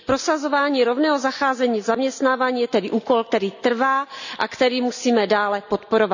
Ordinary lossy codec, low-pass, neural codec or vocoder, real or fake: none; 7.2 kHz; none; real